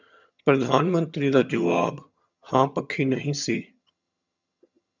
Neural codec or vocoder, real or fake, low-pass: vocoder, 22.05 kHz, 80 mel bands, HiFi-GAN; fake; 7.2 kHz